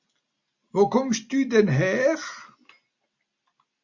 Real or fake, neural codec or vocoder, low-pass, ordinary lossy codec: real; none; 7.2 kHz; Opus, 64 kbps